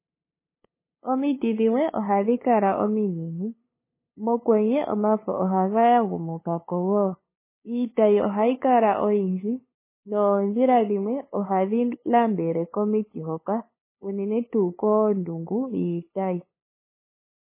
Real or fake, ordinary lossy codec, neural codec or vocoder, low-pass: fake; MP3, 16 kbps; codec, 16 kHz, 8 kbps, FunCodec, trained on LibriTTS, 25 frames a second; 3.6 kHz